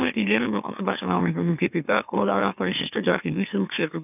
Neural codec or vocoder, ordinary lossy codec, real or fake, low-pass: autoencoder, 44.1 kHz, a latent of 192 numbers a frame, MeloTTS; none; fake; 3.6 kHz